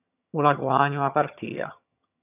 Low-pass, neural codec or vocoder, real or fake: 3.6 kHz; vocoder, 22.05 kHz, 80 mel bands, HiFi-GAN; fake